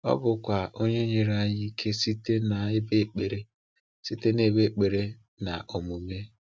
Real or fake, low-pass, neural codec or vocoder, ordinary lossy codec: real; none; none; none